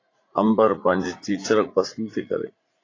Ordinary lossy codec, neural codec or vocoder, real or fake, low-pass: AAC, 32 kbps; vocoder, 44.1 kHz, 80 mel bands, Vocos; fake; 7.2 kHz